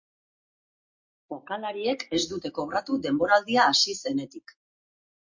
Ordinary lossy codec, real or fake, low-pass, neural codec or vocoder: MP3, 48 kbps; real; 7.2 kHz; none